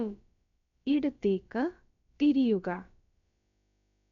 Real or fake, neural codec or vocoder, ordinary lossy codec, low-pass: fake; codec, 16 kHz, about 1 kbps, DyCAST, with the encoder's durations; MP3, 64 kbps; 7.2 kHz